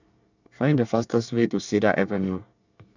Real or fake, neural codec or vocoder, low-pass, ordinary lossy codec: fake; codec, 24 kHz, 1 kbps, SNAC; 7.2 kHz; none